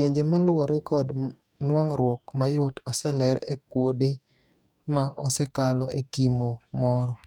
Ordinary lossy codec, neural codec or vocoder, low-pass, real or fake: none; codec, 44.1 kHz, 2.6 kbps, DAC; 19.8 kHz; fake